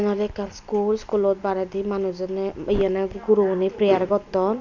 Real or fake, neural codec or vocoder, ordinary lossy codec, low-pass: real; none; none; 7.2 kHz